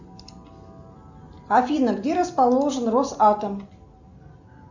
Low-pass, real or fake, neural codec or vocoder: 7.2 kHz; real; none